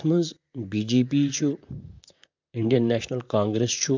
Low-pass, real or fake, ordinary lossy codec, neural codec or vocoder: 7.2 kHz; fake; MP3, 64 kbps; vocoder, 44.1 kHz, 128 mel bands, Pupu-Vocoder